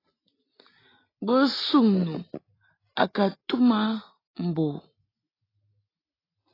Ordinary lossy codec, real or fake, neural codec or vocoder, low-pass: AAC, 24 kbps; real; none; 5.4 kHz